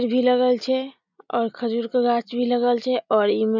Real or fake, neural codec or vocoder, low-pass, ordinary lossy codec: real; none; 7.2 kHz; none